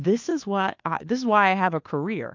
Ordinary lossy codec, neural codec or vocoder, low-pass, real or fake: MP3, 48 kbps; codec, 16 kHz, 2 kbps, FunCodec, trained on Chinese and English, 25 frames a second; 7.2 kHz; fake